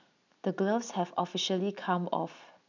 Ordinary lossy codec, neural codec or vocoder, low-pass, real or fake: none; none; 7.2 kHz; real